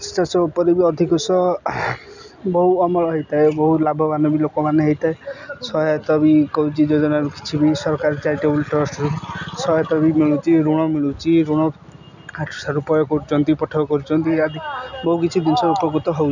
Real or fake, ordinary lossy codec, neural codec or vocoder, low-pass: real; none; none; 7.2 kHz